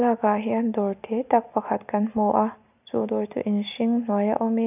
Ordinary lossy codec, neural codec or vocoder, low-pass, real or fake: none; none; 3.6 kHz; real